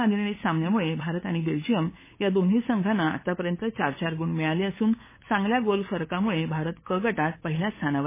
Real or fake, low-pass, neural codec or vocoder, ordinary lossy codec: fake; 3.6 kHz; codec, 16 kHz, 8 kbps, FunCodec, trained on LibriTTS, 25 frames a second; MP3, 16 kbps